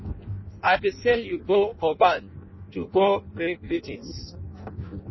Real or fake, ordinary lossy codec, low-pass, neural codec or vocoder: fake; MP3, 24 kbps; 7.2 kHz; codec, 16 kHz in and 24 kHz out, 0.6 kbps, FireRedTTS-2 codec